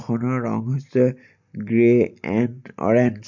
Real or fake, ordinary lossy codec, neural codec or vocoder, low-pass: real; none; none; 7.2 kHz